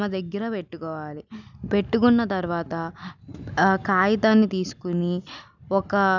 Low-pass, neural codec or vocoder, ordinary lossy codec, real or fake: 7.2 kHz; none; none; real